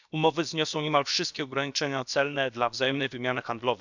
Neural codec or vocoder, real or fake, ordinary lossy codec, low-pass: codec, 16 kHz, about 1 kbps, DyCAST, with the encoder's durations; fake; none; 7.2 kHz